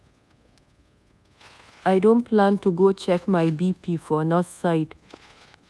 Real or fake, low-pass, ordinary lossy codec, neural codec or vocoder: fake; none; none; codec, 24 kHz, 1.2 kbps, DualCodec